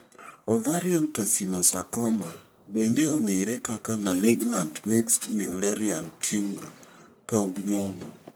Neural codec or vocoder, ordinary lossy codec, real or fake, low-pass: codec, 44.1 kHz, 1.7 kbps, Pupu-Codec; none; fake; none